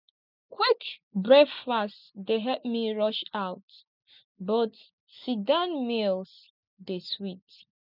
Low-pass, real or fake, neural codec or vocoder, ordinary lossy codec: 5.4 kHz; real; none; none